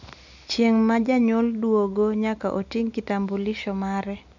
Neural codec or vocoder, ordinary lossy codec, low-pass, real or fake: none; none; 7.2 kHz; real